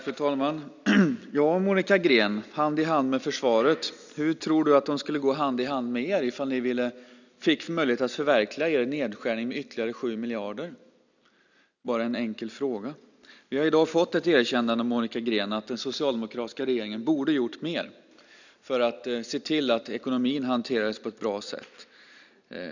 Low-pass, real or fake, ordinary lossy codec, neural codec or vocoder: 7.2 kHz; real; none; none